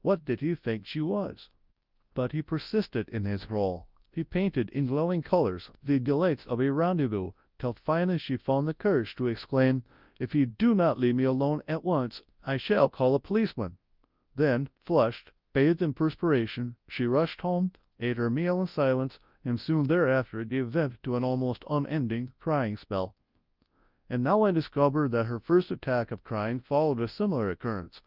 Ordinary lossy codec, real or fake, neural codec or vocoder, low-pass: Opus, 24 kbps; fake; codec, 24 kHz, 0.9 kbps, WavTokenizer, large speech release; 5.4 kHz